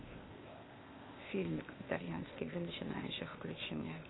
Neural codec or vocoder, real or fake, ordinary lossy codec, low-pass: codec, 16 kHz, 0.8 kbps, ZipCodec; fake; AAC, 16 kbps; 7.2 kHz